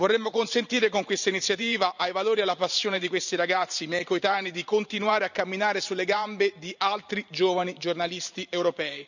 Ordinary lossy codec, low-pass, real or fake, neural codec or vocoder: none; 7.2 kHz; fake; vocoder, 44.1 kHz, 128 mel bands every 512 samples, BigVGAN v2